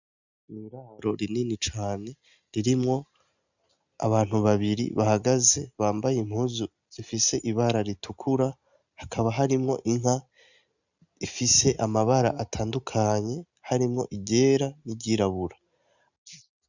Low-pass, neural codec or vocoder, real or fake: 7.2 kHz; none; real